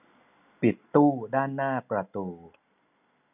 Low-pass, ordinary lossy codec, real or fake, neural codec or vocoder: 3.6 kHz; none; real; none